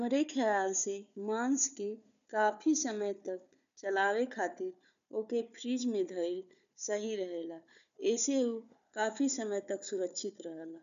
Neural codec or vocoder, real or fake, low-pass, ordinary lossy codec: codec, 16 kHz, 4 kbps, FunCodec, trained on Chinese and English, 50 frames a second; fake; 7.2 kHz; none